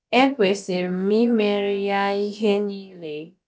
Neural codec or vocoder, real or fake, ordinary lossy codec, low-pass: codec, 16 kHz, about 1 kbps, DyCAST, with the encoder's durations; fake; none; none